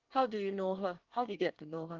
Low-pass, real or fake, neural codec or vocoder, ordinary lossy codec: 7.2 kHz; fake; codec, 24 kHz, 1 kbps, SNAC; Opus, 24 kbps